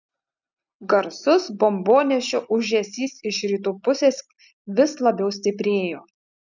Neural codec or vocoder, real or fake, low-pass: none; real; 7.2 kHz